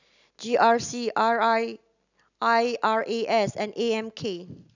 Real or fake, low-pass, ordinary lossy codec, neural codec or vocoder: real; 7.2 kHz; none; none